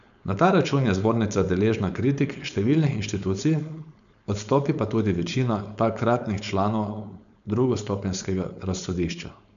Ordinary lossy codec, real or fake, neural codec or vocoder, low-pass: none; fake; codec, 16 kHz, 4.8 kbps, FACodec; 7.2 kHz